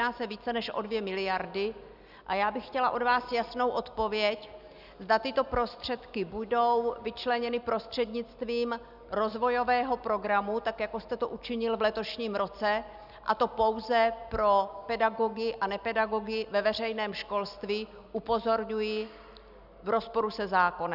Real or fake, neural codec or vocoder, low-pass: real; none; 5.4 kHz